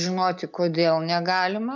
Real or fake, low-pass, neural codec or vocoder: real; 7.2 kHz; none